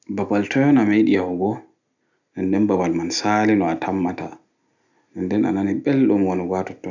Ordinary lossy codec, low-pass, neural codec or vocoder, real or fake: none; 7.2 kHz; none; real